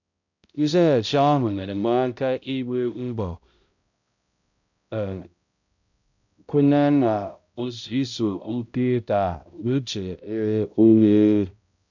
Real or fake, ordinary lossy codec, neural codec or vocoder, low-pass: fake; none; codec, 16 kHz, 0.5 kbps, X-Codec, HuBERT features, trained on balanced general audio; 7.2 kHz